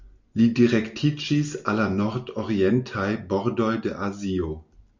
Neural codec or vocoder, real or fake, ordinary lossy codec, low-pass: none; real; MP3, 64 kbps; 7.2 kHz